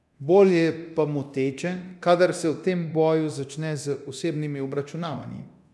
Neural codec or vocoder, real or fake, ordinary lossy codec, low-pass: codec, 24 kHz, 0.9 kbps, DualCodec; fake; none; none